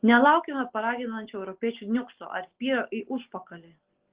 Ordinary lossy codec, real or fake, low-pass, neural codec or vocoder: Opus, 16 kbps; real; 3.6 kHz; none